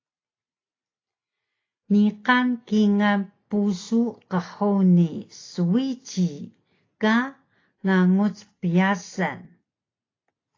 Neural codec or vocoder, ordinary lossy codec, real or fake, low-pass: none; AAC, 32 kbps; real; 7.2 kHz